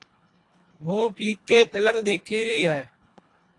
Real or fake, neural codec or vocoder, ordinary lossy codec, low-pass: fake; codec, 24 kHz, 1.5 kbps, HILCodec; AAC, 48 kbps; 10.8 kHz